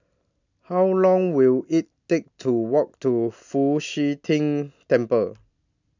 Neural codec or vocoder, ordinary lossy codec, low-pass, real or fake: none; none; 7.2 kHz; real